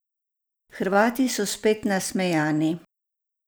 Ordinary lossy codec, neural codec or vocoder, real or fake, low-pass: none; none; real; none